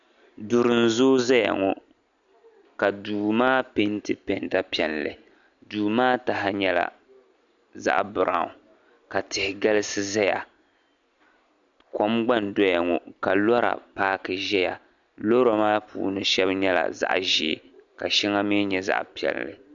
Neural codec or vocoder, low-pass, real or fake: none; 7.2 kHz; real